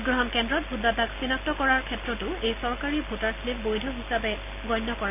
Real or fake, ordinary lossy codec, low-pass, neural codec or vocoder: real; none; 3.6 kHz; none